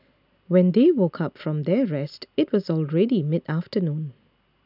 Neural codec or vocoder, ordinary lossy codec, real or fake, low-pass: none; none; real; 5.4 kHz